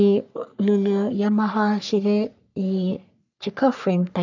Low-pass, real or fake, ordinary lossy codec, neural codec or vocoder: 7.2 kHz; fake; none; codec, 44.1 kHz, 3.4 kbps, Pupu-Codec